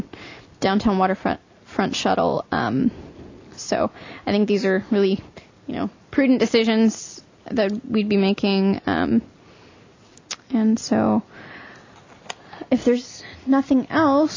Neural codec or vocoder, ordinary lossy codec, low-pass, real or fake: none; MP3, 64 kbps; 7.2 kHz; real